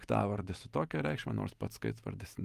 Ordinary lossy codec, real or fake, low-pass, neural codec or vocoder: Opus, 32 kbps; real; 14.4 kHz; none